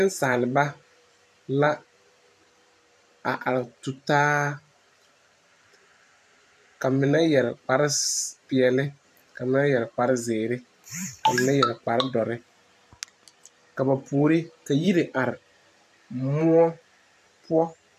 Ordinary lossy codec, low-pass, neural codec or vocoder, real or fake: AAC, 96 kbps; 14.4 kHz; vocoder, 44.1 kHz, 128 mel bands every 512 samples, BigVGAN v2; fake